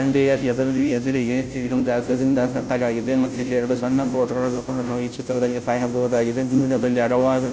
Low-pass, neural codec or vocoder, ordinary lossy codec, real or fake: none; codec, 16 kHz, 0.5 kbps, FunCodec, trained on Chinese and English, 25 frames a second; none; fake